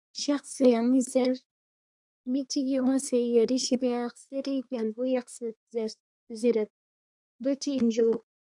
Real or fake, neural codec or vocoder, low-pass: fake; codec, 24 kHz, 1 kbps, SNAC; 10.8 kHz